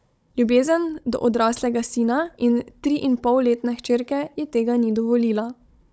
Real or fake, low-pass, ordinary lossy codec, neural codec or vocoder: fake; none; none; codec, 16 kHz, 16 kbps, FunCodec, trained on Chinese and English, 50 frames a second